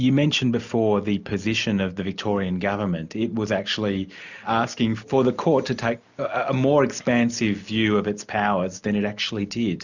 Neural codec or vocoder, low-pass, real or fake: none; 7.2 kHz; real